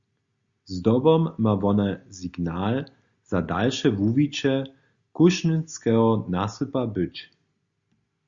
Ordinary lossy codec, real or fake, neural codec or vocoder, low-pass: Opus, 64 kbps; real; none; 7.2 kHz